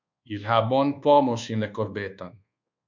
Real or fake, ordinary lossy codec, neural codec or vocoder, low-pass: fake; MP3, 64 kbps; codec, 24 kHz, 1.2 kbps, DualCodec; 7.2 kHz